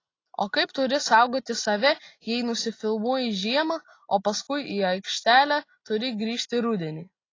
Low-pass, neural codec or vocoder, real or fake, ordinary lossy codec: 7.2 kHz; none; real; AAC, 32 kbps